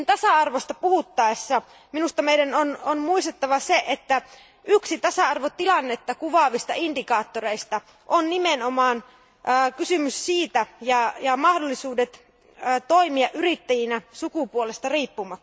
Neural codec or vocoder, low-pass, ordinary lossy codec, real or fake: none; none; none; real